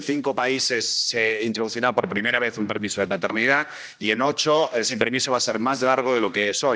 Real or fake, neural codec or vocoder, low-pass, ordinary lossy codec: fake; codec, 16 kHz, 1 kbps, X-Codec, HuBERT features, trained on general audio; none; none